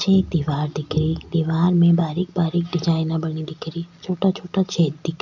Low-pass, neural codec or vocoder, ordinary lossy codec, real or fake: 7.2 kHz; none; none; real